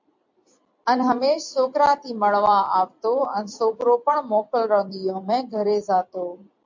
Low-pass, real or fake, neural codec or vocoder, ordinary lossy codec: 7.2 kHz; fake; vocoder, 44.1 kHz, 128 mel bands every 256 samples, BigVGAN v2; MP3, 64 kbps